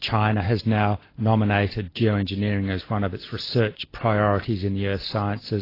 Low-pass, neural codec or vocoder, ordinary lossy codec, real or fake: 5.4 kHz; none; AAC, 24 kbps; real